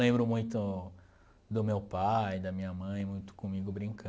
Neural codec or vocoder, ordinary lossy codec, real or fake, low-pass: none; none; real; none